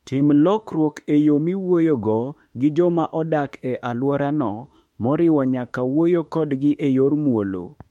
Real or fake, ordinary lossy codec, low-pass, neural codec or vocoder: fake; MP3, 64 kbps; 19.8 kHz; autoencoder, 48 kHz, 32 numbers a frame, DAC-VAE, trained on Japanese speech